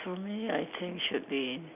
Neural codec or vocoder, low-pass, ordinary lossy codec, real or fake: none; 3.6 kHz; none; real